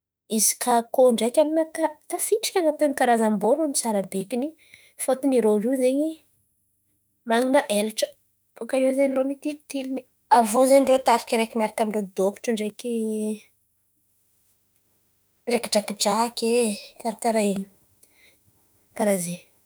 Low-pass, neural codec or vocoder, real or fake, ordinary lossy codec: none; autoencoder, 48 kHz, 32 numbers a frame, DAC-VAE, trained on Japanese speech; fake; none